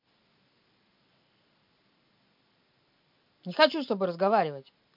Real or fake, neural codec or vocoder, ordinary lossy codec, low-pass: real; none; MP3, 48 kbps; 5.4 kHz